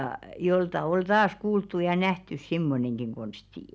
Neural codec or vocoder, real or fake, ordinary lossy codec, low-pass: none; real; none; none